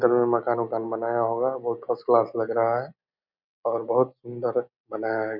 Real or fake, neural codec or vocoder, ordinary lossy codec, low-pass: real; none; none; 5.4 kHz